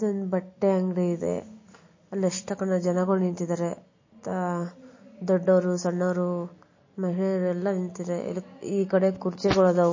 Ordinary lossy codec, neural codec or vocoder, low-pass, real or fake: MP3, 32 kbps; none; 7.2 kHz; real